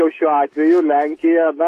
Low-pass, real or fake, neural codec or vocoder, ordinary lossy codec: 14.4 kHz; fake; autoencoder, 48 kHz, 128 numbers a frame, DAC-VAE, trained on Japanese speech; AAC, 48 kbps